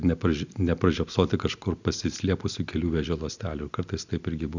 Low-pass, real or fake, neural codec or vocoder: 7.2 kHz; real; none